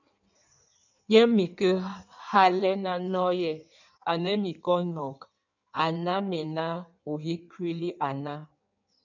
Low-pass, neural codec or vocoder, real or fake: 7.2 kHz; codec, 16 kHz in and 24 kHz out, 1.1 kbps, FireRedTTS-2 codec; fake